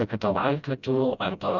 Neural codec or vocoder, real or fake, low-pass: codec, 16 kHz, 0.5 kbps, FreqCodec, smaller model; fake; 7.2 kHz